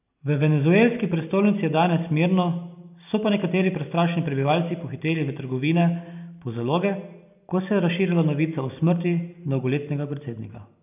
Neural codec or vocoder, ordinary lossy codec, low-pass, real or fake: none; none; 3.6 kHz; real